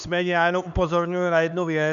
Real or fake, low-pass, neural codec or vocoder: fake; 7.2 kHz; codec, 16 kHz, 4 kbps, X-Codec, HuBERT features, trained on LibriSpeech